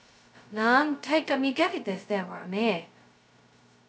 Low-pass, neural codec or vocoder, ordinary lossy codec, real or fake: none; codec, 16 kHz, 0.2 kbps, FocalCodec; none; fake